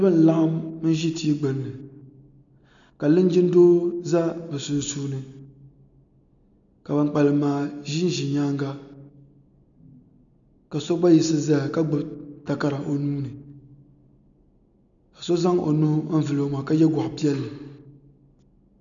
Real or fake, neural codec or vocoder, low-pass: real; none; 7.2 kHz